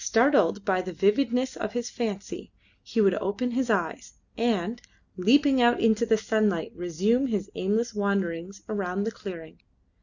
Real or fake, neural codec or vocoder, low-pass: real; none; 7.2 kHz